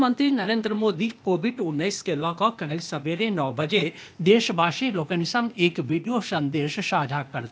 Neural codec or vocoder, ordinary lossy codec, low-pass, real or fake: codec, 16 kHz, 0.8 kbps, ZipCodec; none; none; fake